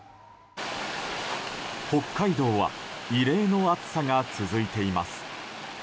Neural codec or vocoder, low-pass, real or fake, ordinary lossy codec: none; none; real; none